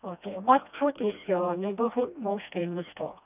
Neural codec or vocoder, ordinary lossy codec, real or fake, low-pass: codec, 16 kHz, 1 kbps, FreqCodec, smaller model; none; fake; 3.6 kHz